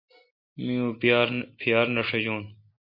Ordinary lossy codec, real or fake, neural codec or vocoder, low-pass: MP3, 32 kbps; real; none; 5.4 kHz